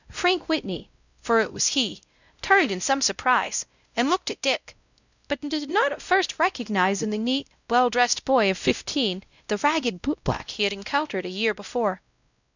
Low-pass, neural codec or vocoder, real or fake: 7.2 kHz; codec, 16 kHz, 0.5 kbps, X-Codec, WavLM features, trained on Multilingual LibriSpeech; fake